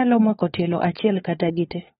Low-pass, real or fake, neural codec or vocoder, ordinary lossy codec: 7.2 kHz; fake; codec, 16 kHz, 4 kbps, FunCodec, trained on LibriTTS, 50 frames a second; AAC, 16 kbps